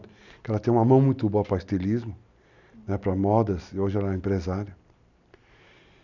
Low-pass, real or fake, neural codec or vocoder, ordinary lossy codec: 7.2 kHz; real; none; none